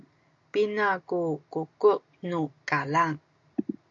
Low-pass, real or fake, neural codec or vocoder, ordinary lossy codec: 7.2 kHz; real; none; AAC, 64 kbps